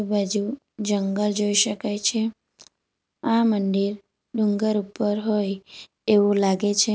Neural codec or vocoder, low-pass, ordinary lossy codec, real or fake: none; none; none; real